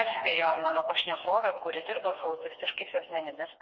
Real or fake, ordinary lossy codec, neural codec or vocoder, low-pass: fake; MP3, 32 kbps; codec, 16 kHz, 2 kbps, FreqCodec, smaller model; 7.2 kHz